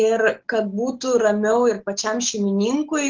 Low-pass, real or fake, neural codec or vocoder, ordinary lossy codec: 7.2 kHz; real; none; Opus, 16 kbps